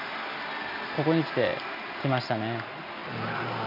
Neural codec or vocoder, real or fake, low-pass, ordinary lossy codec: none; real; 5.4 kHz; none